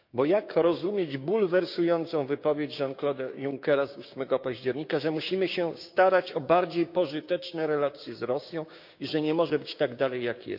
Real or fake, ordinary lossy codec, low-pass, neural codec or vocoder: fake; none; 5.4 kHz; codec, 16 kHz, 6 kbps, DAC